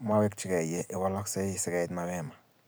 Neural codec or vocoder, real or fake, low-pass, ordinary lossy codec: none; real; none; none